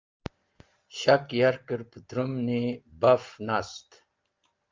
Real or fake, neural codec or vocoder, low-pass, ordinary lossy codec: real; none; 7.2 kHz; Opus, 32 kbps